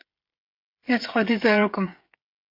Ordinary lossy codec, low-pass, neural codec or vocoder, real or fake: MP3, 32 kbps; 5.4 kHz; codec, 16 kHz, 16 kbps, FreqCodec, smaller model; fake